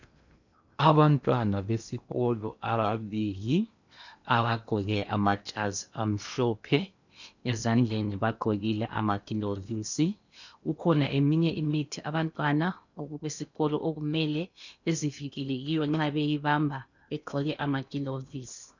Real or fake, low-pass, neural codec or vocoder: fake; 7.2 kHz; codec, 16 kHz in and 24 kHz out, 0.8 kbps, FocalCodec, streaming, 65536 codes